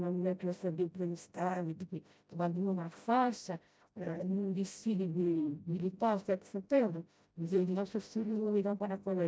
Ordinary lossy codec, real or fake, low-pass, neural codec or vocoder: none; fake; none; codec, 16 kHz, 0.5 kbps, FreqCodec, smaller model